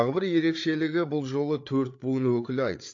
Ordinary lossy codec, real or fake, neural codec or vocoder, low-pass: AAC, 48 kbps; fake; codec, 16 kHz, 8 kbps, FreqCodec, larger model; 7.2 kHz